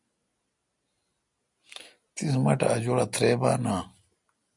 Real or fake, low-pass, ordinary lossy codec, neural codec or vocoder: real; 10.8 kHz; MP3, 64 kbps; none